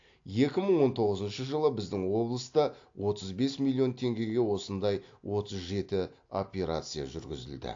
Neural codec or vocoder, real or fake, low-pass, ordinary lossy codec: none; real; 7.2 kHz; AAC, 48 kbps